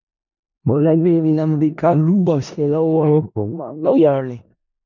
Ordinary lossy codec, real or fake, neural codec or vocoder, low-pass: none; fake; codec, 16 kHz in and 24 kHz out, 0.4 kbps, LongCat-Audio-Codec, four codebook decoder; 7.2 kHz